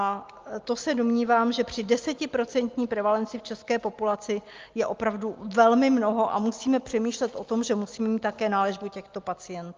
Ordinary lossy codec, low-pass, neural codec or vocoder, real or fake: Opus, 32 kbps; 7.2 kHz; none; real